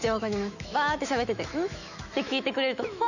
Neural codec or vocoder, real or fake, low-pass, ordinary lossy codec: autoencoder, 48 kHz, 128 numbers a frame, DAC-VAE, trained on Japanese speech; fake; 7.2 kHz; none